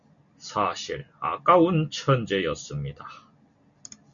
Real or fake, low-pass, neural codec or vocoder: real; 7.2 kHz; none